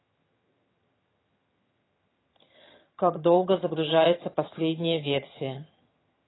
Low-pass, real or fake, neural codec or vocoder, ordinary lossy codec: 7.2 kHz; fake; vocoder, 22.05 kHz, 80 mel bands, HiFi-GAN; AAC, 16 kbps